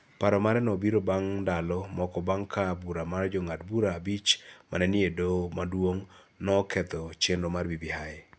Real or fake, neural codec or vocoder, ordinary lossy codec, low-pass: real; none; none; none